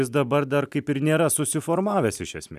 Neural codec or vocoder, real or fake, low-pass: none; real; 14.4 kHz